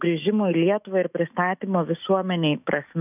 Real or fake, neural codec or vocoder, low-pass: fake; autoencoder, 48 kHz, 128 numbers a frame, DAC-VAE, trained on Japanese speech; 3.6 kHz